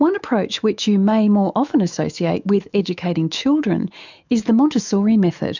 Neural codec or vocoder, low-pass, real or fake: autoencoder, 48 kHz, 128 numbers a frame, DAC-VAE, trained on Japanese speech; 7.2 kHz; fake